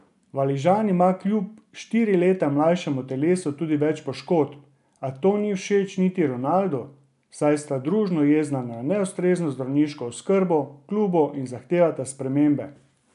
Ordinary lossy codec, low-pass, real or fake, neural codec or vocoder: none; 10.8 kHz; real; none